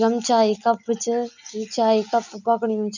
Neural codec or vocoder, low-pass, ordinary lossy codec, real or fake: none; 7.2 kHz; none; real